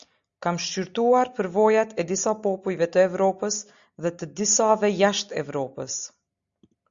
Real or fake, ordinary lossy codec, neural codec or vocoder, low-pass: real; Opus, 64 kbps; none; 7.2 kHz